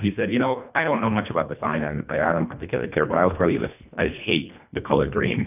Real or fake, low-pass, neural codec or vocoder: fake; 3.6 kHz; codec, 24 kHz, 1.5 kbps, HILCodec